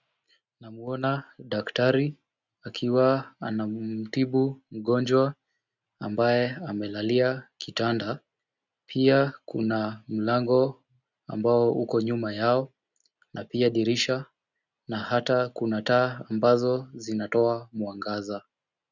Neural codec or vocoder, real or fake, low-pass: none; real; 7.2 kHz